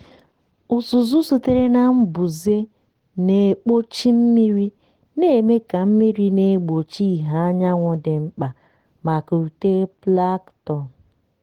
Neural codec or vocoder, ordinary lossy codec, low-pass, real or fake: none; Opus, 16 kbps; 19.8 kHz; real